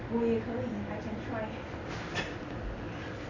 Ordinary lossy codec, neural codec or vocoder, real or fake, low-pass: none; none; real; 7.2 kHz